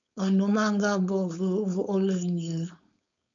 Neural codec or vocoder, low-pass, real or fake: codec, 16 kHz, 4.8 kbps, FACodec; 7.2 kHz; fake